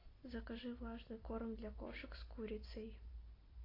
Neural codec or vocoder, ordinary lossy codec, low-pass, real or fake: none; AAC, 24 kbps; 5.4 kHz; real